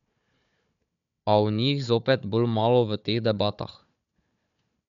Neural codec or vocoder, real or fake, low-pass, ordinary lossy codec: codec, 16 kHz, 4 kbps, FunCodec, trained on Chinese and English, 50 frames a second; fake; 7.2 kHz; none